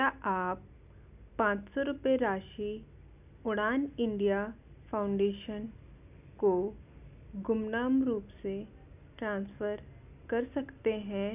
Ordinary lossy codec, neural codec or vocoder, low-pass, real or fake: none; none; 3.6 kHz; real